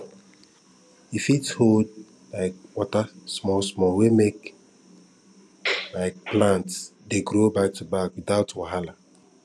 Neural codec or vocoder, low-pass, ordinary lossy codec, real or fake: none; none; none; real